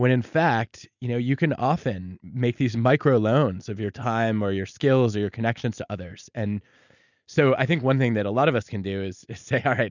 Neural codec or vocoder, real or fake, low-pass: none; real; 7.2 kHz